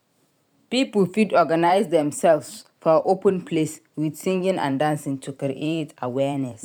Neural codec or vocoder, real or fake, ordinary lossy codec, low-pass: vocoder, 44.1 kHz, 128 mel bands every 512 samples, BigVGAN v2; fake; none; 19.8 kHz